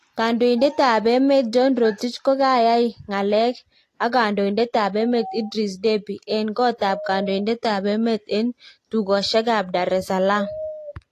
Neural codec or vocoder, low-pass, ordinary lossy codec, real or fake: none; 14.4 kHz; AAC, 48 kbps; real